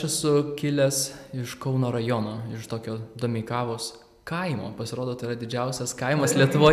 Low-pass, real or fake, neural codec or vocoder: 14.4 kHz; real; none